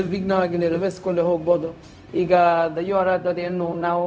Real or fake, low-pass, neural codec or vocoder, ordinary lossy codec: fake; none; codec, 16 kHz, 0.4 kbps, LongCat-Audio-Codec; none